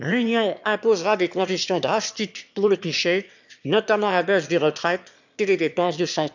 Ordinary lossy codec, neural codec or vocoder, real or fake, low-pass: none; autoencoder, 22.05 kHz, a latent of 192 numbers a frame, VITS, trained on one speaker; fake; 7.2 kHz